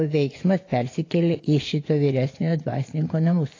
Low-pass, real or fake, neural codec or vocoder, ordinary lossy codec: 7.2 kHz; fake; vocoder, 24 kHz, 100 mel bands, Vocos; AAC, 32 kbps